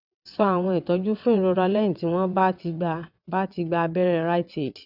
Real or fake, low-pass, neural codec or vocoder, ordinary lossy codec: fake; 5.4 kHz; vocoder, 22.05 kHz, 80 mel bands, WaveNeXt; none